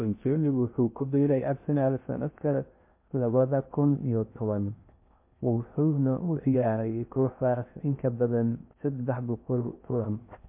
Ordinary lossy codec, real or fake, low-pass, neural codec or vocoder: MP3, 32 kbps; fake; 3.6 kHz; codec, 16 kHz in and 24 kHz out, 0.6 kbps, FocalCodec, streaming, 2048 codes